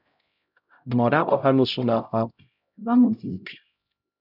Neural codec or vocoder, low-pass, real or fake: codec, 16 kHz, 0.5 kbps, X-Codec, HuBERT features, trained on LibriSpeech; 5.4 kHz; fake